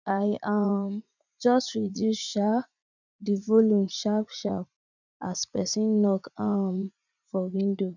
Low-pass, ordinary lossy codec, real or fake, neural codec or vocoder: 7.2 kHz; none; fake; vocoder, 44.1 kHz, 80 mel bands, Vocos